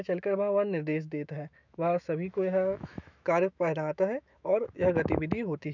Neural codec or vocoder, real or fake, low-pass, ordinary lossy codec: autoencoder, 48 kHz, 128 numbers a frame, DAC-VAE, trained on Japanese speech; fake; 7.2 kHz; none